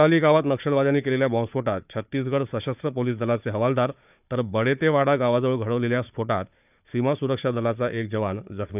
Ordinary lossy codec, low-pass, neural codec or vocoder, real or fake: none; 3.6 kHz; codec, 16 kHz, 4 kbps, FunCodec, trained on Chinese and English, 50 frames a second; fake